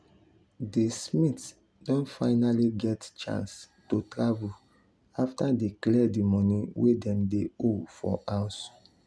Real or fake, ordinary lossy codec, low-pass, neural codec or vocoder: real; none; none; none